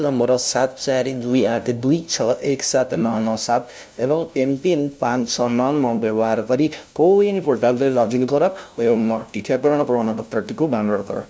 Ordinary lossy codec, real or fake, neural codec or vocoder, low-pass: none; fake; codec, 16 kHz, 0.5 kbps, FunCodec, trained on LibriTTS, 25 frames a second; none